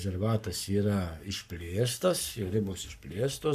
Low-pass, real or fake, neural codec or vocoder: 14.4 kHz; fake; codec, 44.1 kHz, 7.8 kbps, Pupu-Codec